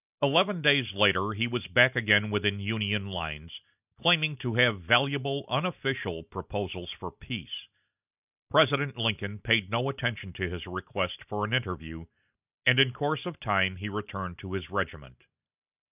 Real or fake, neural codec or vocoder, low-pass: real; none; 3.6 kHz